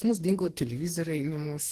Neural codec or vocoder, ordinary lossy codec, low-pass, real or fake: codec, 44.1 kHz, 2.6 kbps, DAC; Opus, 16 kbps; 14.4 kHz; fake